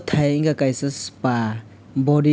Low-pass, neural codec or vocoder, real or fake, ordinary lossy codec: none; none; real; none